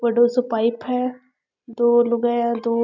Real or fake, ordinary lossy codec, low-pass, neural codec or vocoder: real; none; 7.2 kHz; none